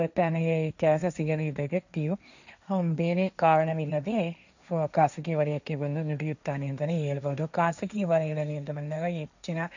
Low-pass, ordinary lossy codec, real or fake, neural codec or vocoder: 7.2 kHz; none; fake; codec, 16 kHz, 1.1 kbps, Voila-Tokenizer